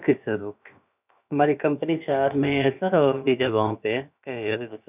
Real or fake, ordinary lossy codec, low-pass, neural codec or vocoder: fake; none; 3.6 kHz; codec, 16 kHz, about 1 kbps, DyCAST, with the encoder's durations